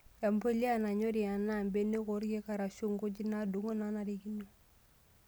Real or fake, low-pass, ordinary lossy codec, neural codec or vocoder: real; none; none; none